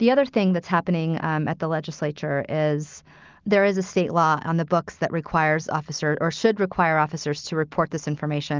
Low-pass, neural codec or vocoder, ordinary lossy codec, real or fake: 7.2 kHz; none; Opus, 32 kbps; real